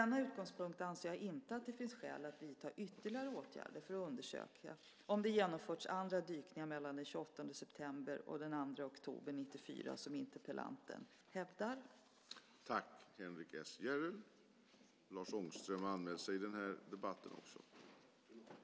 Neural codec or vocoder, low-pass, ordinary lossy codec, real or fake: none; none; none; real